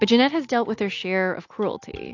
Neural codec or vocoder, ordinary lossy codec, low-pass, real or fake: none; AAC, 48 kbps; 7.2 kHz; real